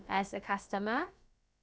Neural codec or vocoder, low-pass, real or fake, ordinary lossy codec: codec, 16 kHz, about 1 kbps, DyCAST, with the encoder's durations; none; fake; none